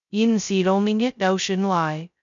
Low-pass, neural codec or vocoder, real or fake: 7.2 kHz; codec, 16 kHz, 0.2 kbps, FocalCodec; fake